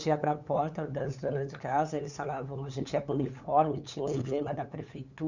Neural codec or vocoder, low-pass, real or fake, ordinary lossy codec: codec, 16 kHz, 8 kbps, FunCodec, trained on LibriTTS, 25 frames a second; 7.2 kHz; fake; none